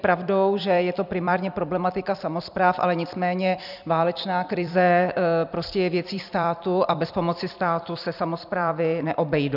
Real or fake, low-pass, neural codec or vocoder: fake; 5.4 kHz; vocoder, 44.1 kHz, 128 mel bands every 256 samples, BigVGAN v2